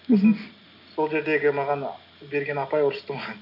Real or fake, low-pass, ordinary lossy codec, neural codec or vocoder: real; 5.4 kHz; none; none